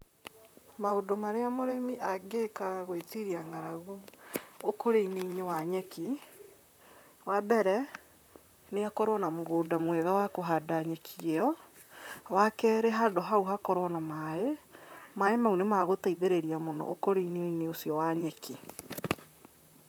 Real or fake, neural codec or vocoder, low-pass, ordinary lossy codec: fake; vocoder, 44.1 kHz, 128 mel bands, Pupu-Vocoder; none; none